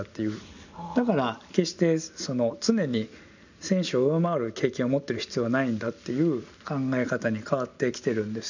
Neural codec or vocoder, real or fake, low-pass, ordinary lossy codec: none; real; 7.2 kHz; none